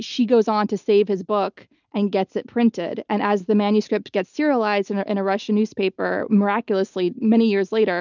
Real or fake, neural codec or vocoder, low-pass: real; none; 7.2 kHz